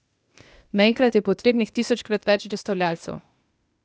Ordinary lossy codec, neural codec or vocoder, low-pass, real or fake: none; codec, 16 kHz, 0.8 kbps, ZipCodec; none; fake